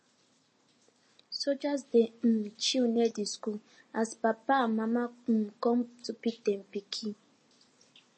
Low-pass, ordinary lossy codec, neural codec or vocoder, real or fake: 9.9 kHz; MP3, 32 kbps; none; real